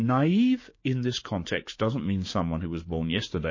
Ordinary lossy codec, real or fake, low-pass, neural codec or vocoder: MP3, 32 kbps; fake; 7.2 kHz; codec, 16 kHz, 6 kbps, DAC